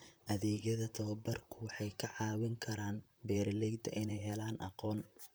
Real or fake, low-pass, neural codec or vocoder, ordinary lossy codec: fake; none; vocoder, 44.1 kHz, 128 mel bands, Pupu-Vocoder; none